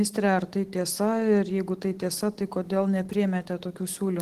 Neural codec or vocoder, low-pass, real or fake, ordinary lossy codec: none; 14.4 kHz; real; Opus, 16 kbps